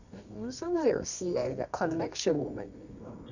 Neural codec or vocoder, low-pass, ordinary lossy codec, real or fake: codec, 24 kHz, 0.9 kbps, WavTokenizer, medium music audio release; 7.2 kHz; none; fake